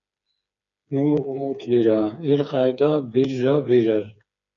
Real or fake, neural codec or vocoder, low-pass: fake; codec, 16 kHz, 4 kbps, FreqCodec, smaller model; 7.2 kHz